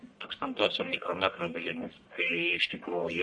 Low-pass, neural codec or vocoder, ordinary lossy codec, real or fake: 10.8 kHz; codec, 44.1 kHz, 1.7 kbps, Pupu-Codec; MP3, 48 kbps; fake